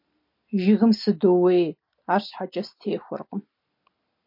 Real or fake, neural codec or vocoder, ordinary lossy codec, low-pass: real; none; MP3, 32 kbps; 5.4 kHz